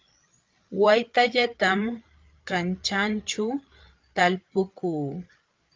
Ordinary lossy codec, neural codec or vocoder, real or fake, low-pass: Opus, 24 kbps; codec, 16 kHz, 8 kbps, FreqCodec, larger model; fake; 7.2 kHz